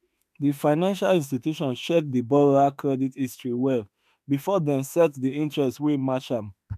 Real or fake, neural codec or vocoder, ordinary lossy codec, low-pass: fake; autoencoder, 48 kHz, 32 numbers a frame, DAC-VAE, trained on Japanese speech; MP3, 96 kbps; 14.4 kHz